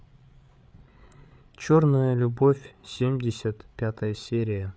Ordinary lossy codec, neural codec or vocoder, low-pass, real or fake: none; codec, 16 kHz, 8 kbps, FreqCodec, larger model; none; fake